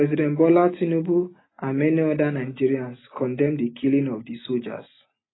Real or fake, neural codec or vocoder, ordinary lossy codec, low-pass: real; none; AAC, 16 kbps; 7.2 kHz